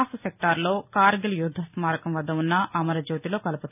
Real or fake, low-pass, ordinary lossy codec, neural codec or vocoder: real; 3.6 kHz; none; none